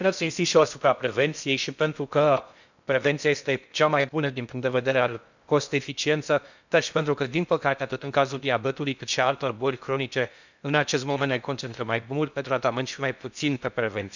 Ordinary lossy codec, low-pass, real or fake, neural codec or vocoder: none; 7.2 kHz; fake; codec, 16 kHz in and 24 kHz out, 0.6 kbps, FocalCodec, streaming, 2048 codes